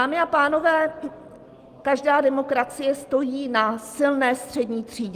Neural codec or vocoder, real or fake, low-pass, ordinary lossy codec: none; real; 14.4 kHz; Opus, 24 kbps